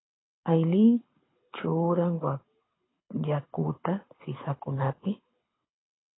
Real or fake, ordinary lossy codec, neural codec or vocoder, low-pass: fake; AAC, 16 kbps; codec, 24 kHz, 6 kbps, HILCodec; 7.2 kHz